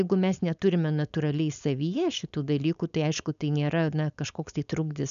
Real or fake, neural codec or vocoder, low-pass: fake; codec, 16 kHz, 4.8 kbps, FACodec; 7.2 kHz